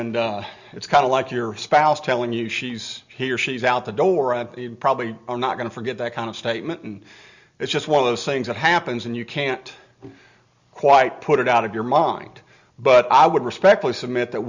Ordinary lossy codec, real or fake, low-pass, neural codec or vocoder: Opus, 64 kbps; real; 7.2 kHz; none